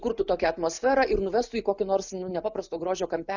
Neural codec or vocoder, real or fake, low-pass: none; real; 7.2 kHz